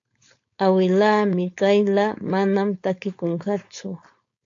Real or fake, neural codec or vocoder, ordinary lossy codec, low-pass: fake; codec, 16 kHz, 4.8 kbps, FACodec; AAC, 48 kbps; 7.2 kHz